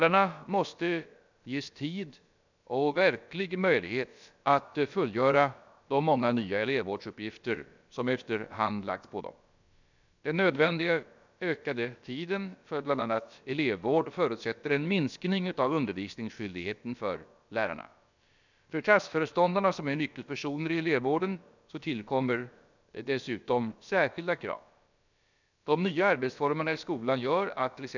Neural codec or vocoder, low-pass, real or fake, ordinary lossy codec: codec, 16 kHz, about 1 kbps, DyCAST, with the encoder's durations; 7.2 kHz; fake; none